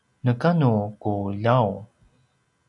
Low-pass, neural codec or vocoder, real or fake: 10.8 kHz; none; real